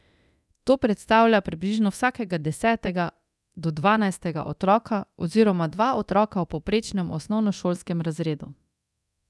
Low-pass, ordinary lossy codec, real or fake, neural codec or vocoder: none; none; fake; codec, 24 kHz, 0.9 kbps, DualCodec